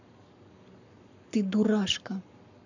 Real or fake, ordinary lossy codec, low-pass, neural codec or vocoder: fake; none; 7.2 kHz; codec, 44.1 kHz, 7.8 kbps, Pupu-Codec